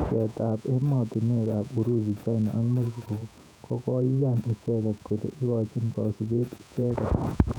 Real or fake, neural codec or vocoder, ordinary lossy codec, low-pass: fake; vocoder, 48 kHz, 128 mel bands, Vocos; none; 19.8 kHz